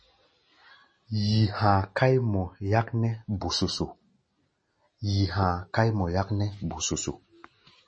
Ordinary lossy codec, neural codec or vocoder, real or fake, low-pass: MP3, 32 kbps; none; real; 9.9 kHz